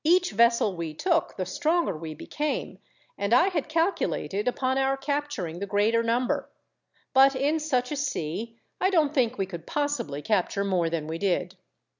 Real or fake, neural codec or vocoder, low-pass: real; none; 7.2 kHz